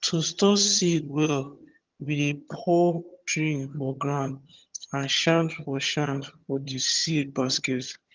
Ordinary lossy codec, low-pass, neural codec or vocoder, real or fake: Opus, 32 kbps; 7.2 kHz; vocoder, 22.05 kHz, 80 mel bands, HiFi-GAN; fake